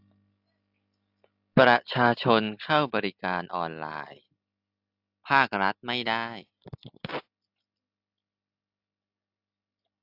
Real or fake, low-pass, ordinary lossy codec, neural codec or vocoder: real; 5.4 kHz; AAC, 48 kbps; none